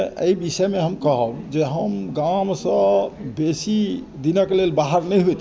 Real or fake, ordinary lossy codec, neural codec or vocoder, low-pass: real; none; none; none